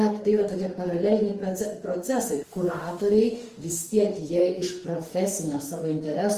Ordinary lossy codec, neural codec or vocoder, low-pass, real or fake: Opus, 24 kbps; autoencoder, 48 kHz, 32 numbers a frame, DAC-VAE, trained on Japanese speech; 14.4 kHz; fake